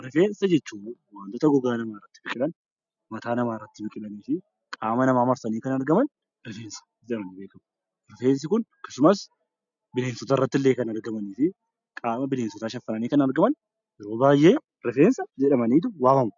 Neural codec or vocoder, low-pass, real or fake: none; 7.2 kHz; real